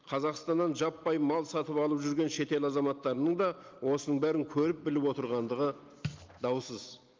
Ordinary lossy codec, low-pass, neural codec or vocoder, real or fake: Opus, 24 kbps; 7.2 kHz; none; real